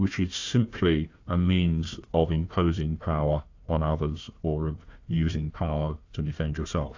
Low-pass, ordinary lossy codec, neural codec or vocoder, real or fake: 7.2 kHz; AAC, 48 kbps; codec, 16 kHz, 1 kbps, FunCodec, trained on Chinese and English, 50 frames a second; fake